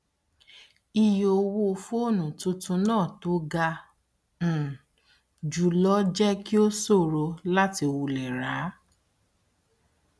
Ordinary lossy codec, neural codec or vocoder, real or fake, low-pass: none; none; real; none